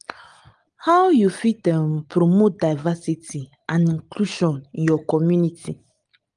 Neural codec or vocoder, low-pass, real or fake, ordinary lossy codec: none; 9.9 kHz; real; Opus, 32 kbps